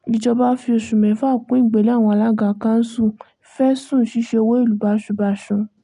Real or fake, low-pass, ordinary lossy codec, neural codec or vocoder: real; 10.8 kHz; none; none